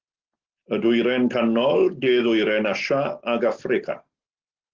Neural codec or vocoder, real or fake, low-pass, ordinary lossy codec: none; real; 7.2 kHz; Opus, 16 kbps